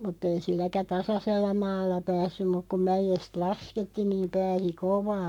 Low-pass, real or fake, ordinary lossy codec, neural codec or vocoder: 19.8 kHz; fake; none; codec, 44.1 kHz, 7.8 kbps, Pupu-Codec